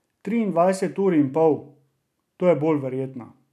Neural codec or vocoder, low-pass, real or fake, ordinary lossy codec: none; 14.4 kHz; real; none